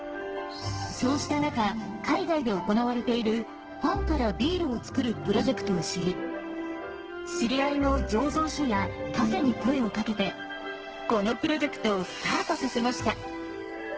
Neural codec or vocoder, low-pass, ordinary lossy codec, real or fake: codec, 32 kHz, 1.9 kbps, SNAC; 7.2 kHz; Opus, 16 kbps; fake